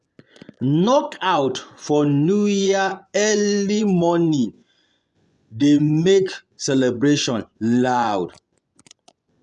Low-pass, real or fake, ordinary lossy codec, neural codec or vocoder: none; fake; none; vocoder, 24 kHz, 100 mel bands, Vocos